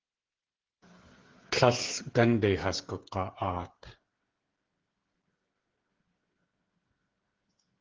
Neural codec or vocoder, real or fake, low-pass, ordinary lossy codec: codec, 16 kHz, 8 kbps, FreqCodec, smaller model; fake; 7.2 kHz; Opus, 16 kbps